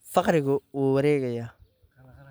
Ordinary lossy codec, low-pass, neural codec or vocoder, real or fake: none; none; none; real